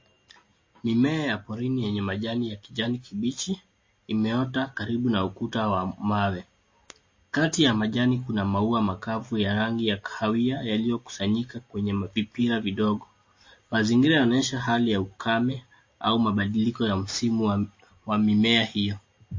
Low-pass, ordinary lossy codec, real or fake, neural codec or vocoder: 7.2 kHz; MP3, 32 kbps; real; none